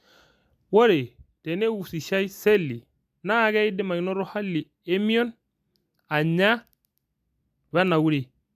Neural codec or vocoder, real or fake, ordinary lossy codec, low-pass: none; real; AAC, 96 kbps; 14.4 kHz